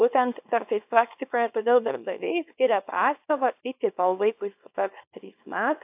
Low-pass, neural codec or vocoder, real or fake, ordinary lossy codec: 3.6 kHz; codec, 24 kHz, 0.9 kbps, WavTokenizer, small release; fake; AAC, 32 kbps